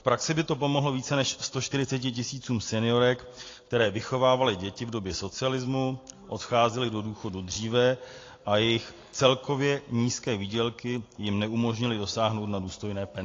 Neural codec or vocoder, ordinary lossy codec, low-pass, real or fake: none; AAC, 32 kbps; 7.2 kHz; real